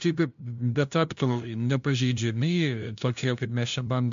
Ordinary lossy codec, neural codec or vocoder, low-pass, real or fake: MP3, 64 kbps; codec, 16 kHz, 0.5 kbps, FunCodec, trained on LibriTTS, 25 frames a second; 7.2 kHz; fake